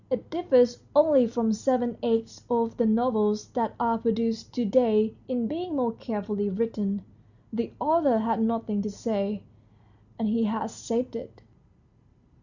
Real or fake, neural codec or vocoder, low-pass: real; none; 7.2 kHz